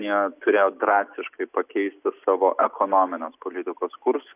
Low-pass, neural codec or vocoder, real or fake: 3.6 kHz; none; real